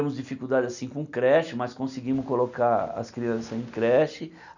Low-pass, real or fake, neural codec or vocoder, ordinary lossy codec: 7.2 kHz; real; none; none